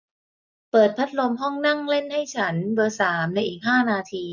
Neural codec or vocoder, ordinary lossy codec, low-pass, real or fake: none; none; 7.2 kHz; real